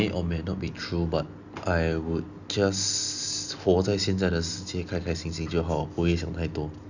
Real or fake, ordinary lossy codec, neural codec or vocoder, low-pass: real; none; none; 7.2 kHz